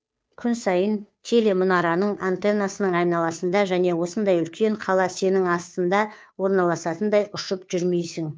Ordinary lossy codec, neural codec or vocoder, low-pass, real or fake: none; codec, 16 kHz, 2 kbps, FunCodec, trained on Chinese and English, 25 frames a second; none; fake